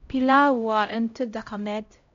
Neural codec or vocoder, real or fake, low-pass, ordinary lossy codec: codec, 16 kHz, 0.5 kbps, X-Codec, HuBERT features, trained on LibriSpeech; fake; 7.2 kHz; MP3, 48 kbps